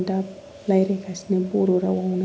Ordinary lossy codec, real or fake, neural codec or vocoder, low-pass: none; real; none; none